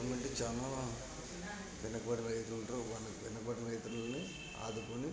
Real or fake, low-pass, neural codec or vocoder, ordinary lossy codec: real; none; none; none